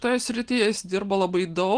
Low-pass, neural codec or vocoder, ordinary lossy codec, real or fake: 9.9 kHz; none; Opus, 24 kbps; real